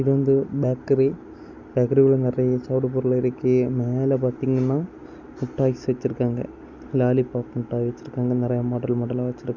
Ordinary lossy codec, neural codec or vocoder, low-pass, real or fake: none; none; 7.2 kHz; real